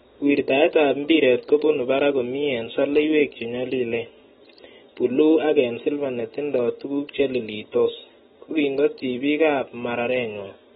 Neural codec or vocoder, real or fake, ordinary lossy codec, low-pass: none; real; AAC, 16 kbps; 19.8 kHz